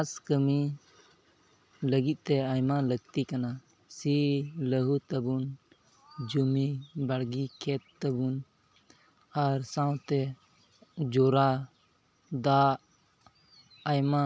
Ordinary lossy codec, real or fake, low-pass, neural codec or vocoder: none; real; none; none